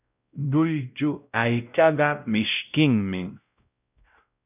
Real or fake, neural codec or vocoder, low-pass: fake; codec, 16 kHz, 0.5 kbps, X-Codec, WavLM features, trained on Multilingual LibriSpeech; 3.6 kHz